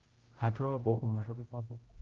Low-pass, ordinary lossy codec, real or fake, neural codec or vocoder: 7.2 kHz; Opus, 16 kbps; fake; codec, 16 kHz, 0.5 kbps, X-Codec, HuBERT features, trained on general audio